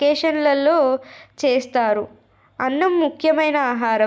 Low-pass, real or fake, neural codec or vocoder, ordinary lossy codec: none; real; none; none